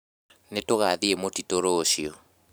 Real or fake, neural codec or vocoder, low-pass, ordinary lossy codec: fake; vocoder, 44.1 kHz, 128 mel bands every 512 samples, BigVGAN v2; none; none